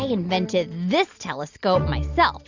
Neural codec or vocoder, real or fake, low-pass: none; real; 7.2 kHz